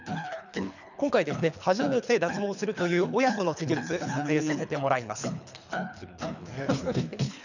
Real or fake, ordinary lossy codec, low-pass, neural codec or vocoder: fake; none; 7.2 kHz; codec, 24 kHz, 3 kbps, HILCodec